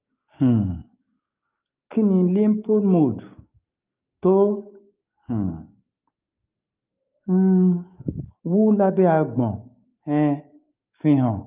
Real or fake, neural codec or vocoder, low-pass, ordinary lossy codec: fake; codec, 16 kHz, 6 kbps, DAC; 3.6 kHz; Opus, 24 kbps